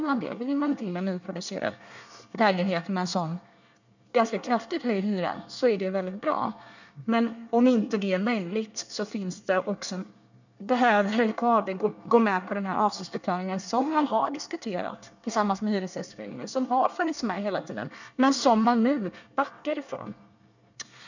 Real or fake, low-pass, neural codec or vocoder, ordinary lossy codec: fake; 7.2 kHz; codec, 24 kHz, 1 kbps, SNAC; none